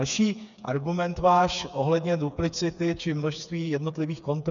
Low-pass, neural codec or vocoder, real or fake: 7.2 kHz; codec, 16 kHz, 4 kbps, FreqCodec, smaller model; fake